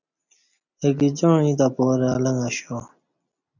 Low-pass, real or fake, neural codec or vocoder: 7.2 kHz; real; none